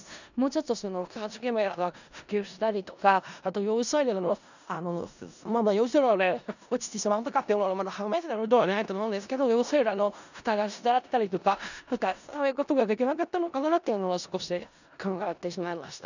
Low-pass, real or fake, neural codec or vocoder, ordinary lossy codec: 7.2 kHz; fake; codec, 16 kHz in and 24 kHz out, 0.4 kbps, LongCat-Audio-Codec, four codebook decoder; none